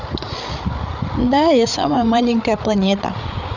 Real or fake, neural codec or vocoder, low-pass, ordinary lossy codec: fake; codec, 16 kHz, 8 kbps, FreqCodec, larger model; 7.2 kHz; none